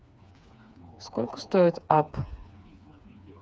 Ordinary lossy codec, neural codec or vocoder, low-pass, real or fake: none; codec, 16 kHz, 4 kbps, FreqCodec, smaller model; none; fake